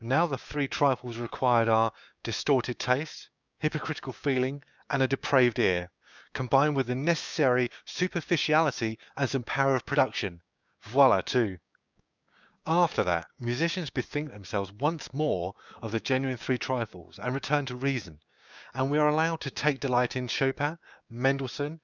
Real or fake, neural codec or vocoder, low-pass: fake; codec, 16 kHz, 6 kbps, DAC; 7.2 kHz